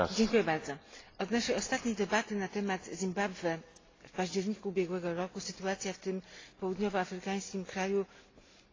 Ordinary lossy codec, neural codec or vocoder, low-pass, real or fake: AAC, 32 kbps; none; 7.2 kHz; real